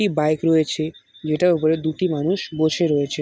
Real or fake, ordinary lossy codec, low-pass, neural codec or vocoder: real; none; none; none